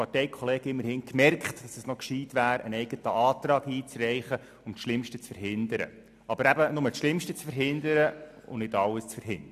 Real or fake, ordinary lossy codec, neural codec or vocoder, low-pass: real; AAC, 96 kbps; none; 14.4 kHz